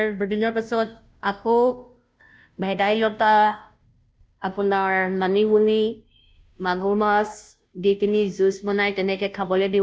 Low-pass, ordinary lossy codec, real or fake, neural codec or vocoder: none; none; fake; codec, 16 kHz, 0.5 kbps, FunCodec, trained on Chinese and English, 25 frames a second